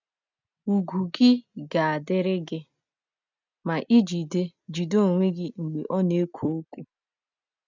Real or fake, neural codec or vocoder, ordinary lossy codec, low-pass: real; none; none; 7.2 kHz